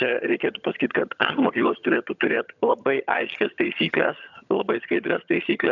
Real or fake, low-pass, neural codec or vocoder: fake; 7.2 kHz; vocoder, 22.05 kHz, 80 mel bands, HiFi-GAN